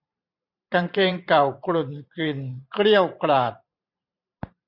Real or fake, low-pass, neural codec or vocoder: fake; 5.4 kHz; vocoder, 44.1 kHz, 128 mel bands every 512 samples, BigVGAN v2